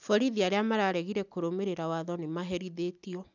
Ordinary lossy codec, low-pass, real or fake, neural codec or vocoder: none; 7.2 kHz; real; none